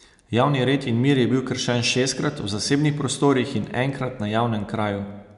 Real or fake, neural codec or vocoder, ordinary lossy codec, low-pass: real; none; none; 10.8 kHz